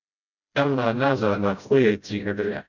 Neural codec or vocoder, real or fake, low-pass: codec, 16 kHz, 0.5 kbps, FreqCodec, smaller model; fake; 7.2 kHz